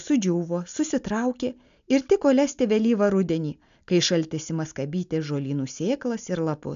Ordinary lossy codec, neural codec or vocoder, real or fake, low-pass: AAC, 96 kbps; none; real; 7.2 kHz